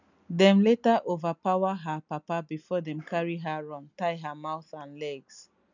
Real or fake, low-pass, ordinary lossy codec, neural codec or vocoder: real; 7.2 kHz; none; none